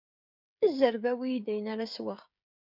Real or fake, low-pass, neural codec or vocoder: fake; 5.4 kHz; codec, 16 kHz, 16 kbps, FreqCodec, smaller model